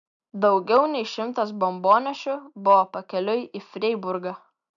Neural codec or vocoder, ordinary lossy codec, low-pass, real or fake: none; MP3, 96 kbps; 7.2 kHz; real